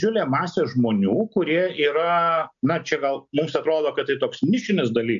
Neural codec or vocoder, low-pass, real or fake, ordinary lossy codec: none; 7.2 kHz; real; MP3, 64 kbps